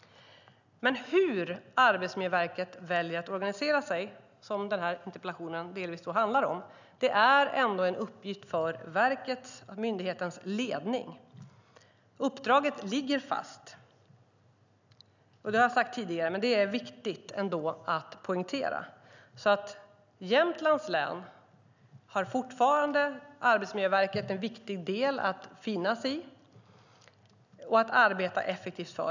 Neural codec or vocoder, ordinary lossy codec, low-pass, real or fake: none; none; 7.2 kHz; real